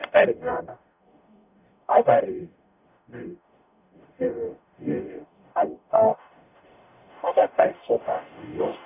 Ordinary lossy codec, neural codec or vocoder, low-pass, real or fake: none; codec, 44.1 kHz, 0.9 kbps, DAC; 3.6 kHz; fake